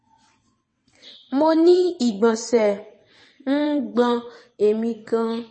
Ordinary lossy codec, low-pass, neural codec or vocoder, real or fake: MP3, 32 kbps; 9.9 kHz; vocoder, 22.05 kHz, 80 mel bands, WaveNeXt; fake